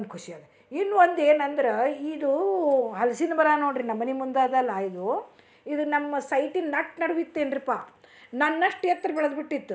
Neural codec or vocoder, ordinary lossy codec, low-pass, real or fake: none; none; none; real